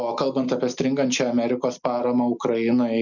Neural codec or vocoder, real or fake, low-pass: none; real; 7.2 kHz